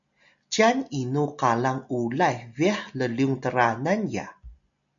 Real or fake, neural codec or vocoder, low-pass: real; none; 7.2 kHz